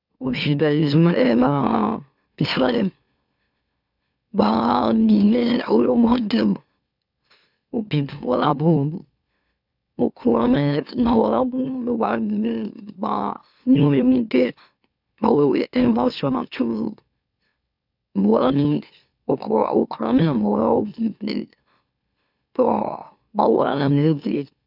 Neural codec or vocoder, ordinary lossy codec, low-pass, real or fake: autoencoder, 44.1 kHz, a latent of 192 numbers a frame, MeloTTS; none; 5.4 kHz; fake